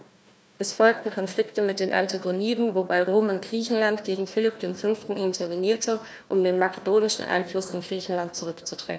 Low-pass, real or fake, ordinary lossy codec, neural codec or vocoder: none; fake; none; codec, 16 kHz, 1 kbps, FunCodec, trained on Chinese and English, 50 frames a second